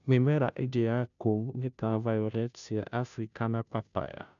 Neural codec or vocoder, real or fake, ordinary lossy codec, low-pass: codec, 16 kHz, 0.5 kbps, FunCodec, trained on Chinese and English, 25 frames a second; fake; none; 7.2 kHz